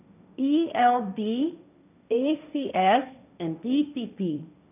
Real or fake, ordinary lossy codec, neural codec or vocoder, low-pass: fake; none; codec, 16 kHz, 1.1 kbps, Voila-Tokenizer; 3.6 kHz